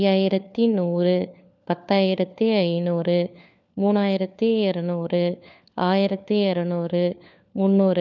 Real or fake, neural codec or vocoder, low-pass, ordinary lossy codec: fake; codec, 16 kHz, 4 kbps, FunCodec, trained on LibriTTS, 50 frames a second; 7.2 kHz; none